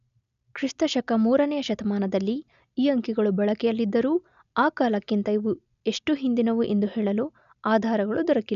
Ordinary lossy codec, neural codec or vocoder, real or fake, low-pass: none; none; real; 7.2 kHz